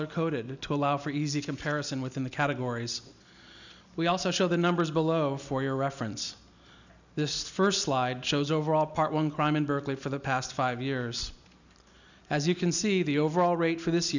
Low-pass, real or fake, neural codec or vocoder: 7.2 kHz; real; none